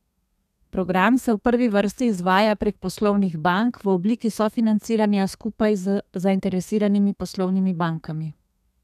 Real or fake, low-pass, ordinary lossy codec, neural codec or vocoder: fake; 14.4 kHz; none; codec, 32 kHz, 1.9 kbps, SNAC